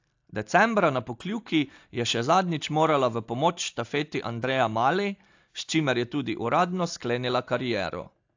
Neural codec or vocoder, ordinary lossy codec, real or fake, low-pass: none; AAC, 48 kbps; real; 7.2 kHz